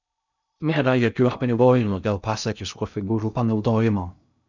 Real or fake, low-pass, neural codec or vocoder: fake; 7.2 kHz; codec, 16 kHz in and 24 kHz out, 0.6 kbps, FocalCodec, streaming, 2048 codes